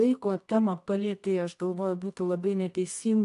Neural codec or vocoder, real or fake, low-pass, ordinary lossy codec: codec, 24 kHz, 0.9 kbps, WavTokenizer, medium music audio release; fake; 10.8 kHz; AAC, 64 kbps